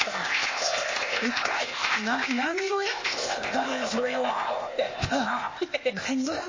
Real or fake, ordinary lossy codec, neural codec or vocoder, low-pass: fake; MP3, 32 kbps; codec, 16 kHz, 0.8 kbps, ZipCodec; 7.2 kHz